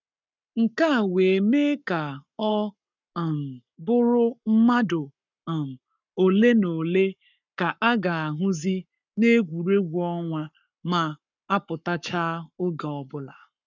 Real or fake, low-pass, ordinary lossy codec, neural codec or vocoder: fake; 7.2 kHz; none; codec, 44.1 kHz, 7.8 kbps, Pupu-Codec